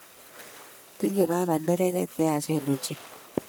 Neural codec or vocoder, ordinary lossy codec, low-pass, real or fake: codec, 44.1 kHz, 1.7 kbps, Pupu-Codec; none; none; fake